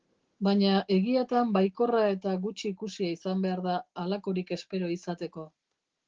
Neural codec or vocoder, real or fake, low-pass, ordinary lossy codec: none; real; 7.2 kHz; Opus, 16 kbps